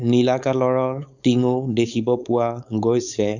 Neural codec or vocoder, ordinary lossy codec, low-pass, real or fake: codec, 16 kHz, 4.8 kbps, FACodec; none; 7.2 kHz; fake